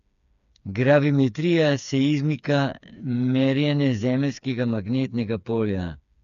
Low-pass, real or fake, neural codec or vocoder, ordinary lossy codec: 7.2 kHz; fake; codec, 16 kHz, 4 kbps, FreqCodec, smaller model; none